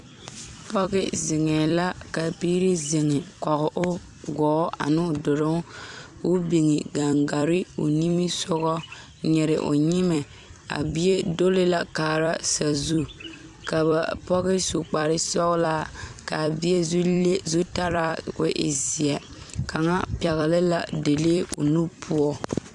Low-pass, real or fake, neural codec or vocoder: 10.8 kHz; fake; vocoder, 44.1 kHz, 128 mel bands every 256 samples, BigVGAN v2